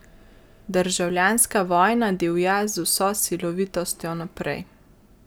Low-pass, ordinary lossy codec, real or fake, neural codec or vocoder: none; none; real; none